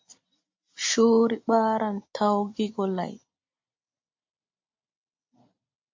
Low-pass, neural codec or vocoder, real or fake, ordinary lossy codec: 7.2 kHz; none; real; MP3, 48 kbps